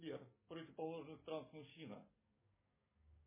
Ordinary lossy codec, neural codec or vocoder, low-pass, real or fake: MP3, 16 kbps; codec, 44.1 kHz, 7.8 kbps, DAC; 3.6 kHz; fake